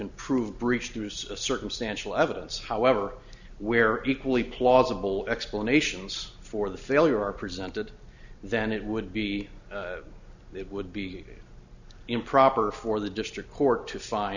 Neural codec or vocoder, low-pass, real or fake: none; 7.2 kHz; real